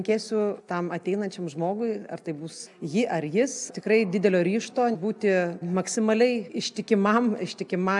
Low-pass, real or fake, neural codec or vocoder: 10.8 kHz; real; none